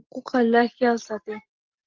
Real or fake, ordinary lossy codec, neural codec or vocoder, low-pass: real; Opus, 32 kbps; none; 7.2 kHz